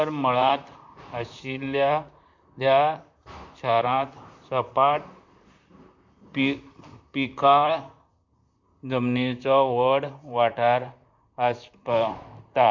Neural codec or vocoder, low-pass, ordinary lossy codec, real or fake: vocoder, 44.1 kHz, 128 mel bands, Pupu-Vocoder; 7.2 kHz; MP3, 64 kbps; fake